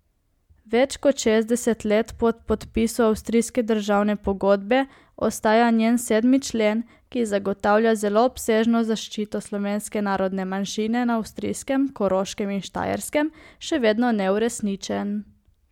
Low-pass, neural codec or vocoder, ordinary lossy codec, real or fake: 19.8 kHz; none; MP3, 96 kbps; real